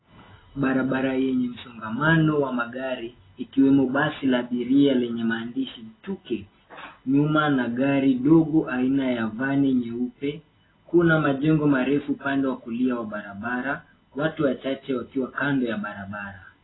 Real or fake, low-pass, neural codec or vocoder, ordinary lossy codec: real; 7.2 kHz; none; AAC, 16 kbps